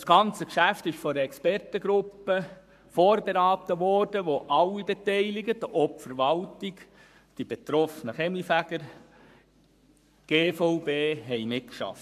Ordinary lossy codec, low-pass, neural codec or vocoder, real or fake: AAC, 96 kbps; 14.4 kHz; codec, 44.1 kHz, 7.8 kbps, Pupu-Codec; fake